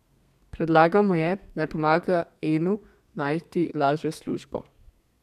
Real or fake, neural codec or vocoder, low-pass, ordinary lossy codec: fake; codec, 32 kHz, 1.9 kbps, SNAC; 14.4 kHz; none